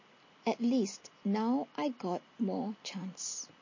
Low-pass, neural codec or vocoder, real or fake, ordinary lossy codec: 7.2 kHz; none; real; MP3, 32 kbps